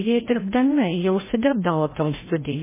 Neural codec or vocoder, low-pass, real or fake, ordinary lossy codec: codec, 16 kHz, 0.5 kbps, FreqCodec, larger model; 3.6 kHz; fake; MP3, 16 kbps